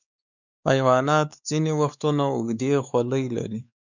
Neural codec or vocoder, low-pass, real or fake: codec, 16 kHz, 4 kbps, X-Codec, WavLM features, trained on Multilingual LibriSpeech; 7.2 kHz; fake